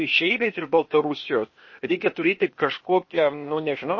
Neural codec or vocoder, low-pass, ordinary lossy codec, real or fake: codec, 16 kHz, 0.8 kbps, ZipCodec; 7.2 kHz; MP3, 32 kbps; fake